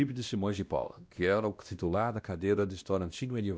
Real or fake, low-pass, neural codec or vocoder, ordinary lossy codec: fake; none; codec, 16 kHz, 1 kbps, X-Codec, WavLM features, trained on Multilingual LibriSpeech; none